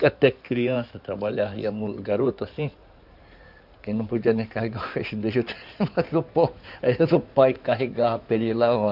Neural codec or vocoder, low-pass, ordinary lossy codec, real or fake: codec, 16 kHz in and 24 kHz out, 2.2 kbps, FireRedTTS-2 codec; 5.4 kHz; none; fake